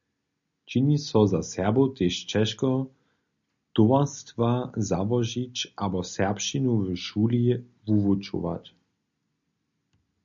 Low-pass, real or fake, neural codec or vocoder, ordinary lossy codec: 7.2 kHz; real; none; MP3, 96 kbps